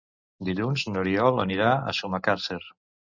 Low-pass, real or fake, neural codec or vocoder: 7.2 kHz; real; none